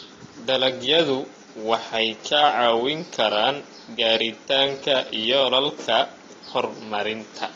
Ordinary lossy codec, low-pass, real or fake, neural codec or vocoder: AAC, 32 kbps; 7.2 kHz; real; none